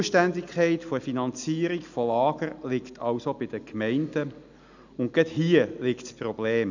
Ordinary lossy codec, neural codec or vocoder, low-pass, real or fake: none; none; 7.2 kHz; real